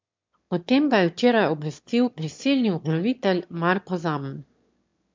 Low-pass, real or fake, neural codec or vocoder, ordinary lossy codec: 7.2 kHz; fake; autoencoder, 22.05 kHz, a latent of 192 numbers a frame, VITS, trained on one speaker; MP3, 64 kbps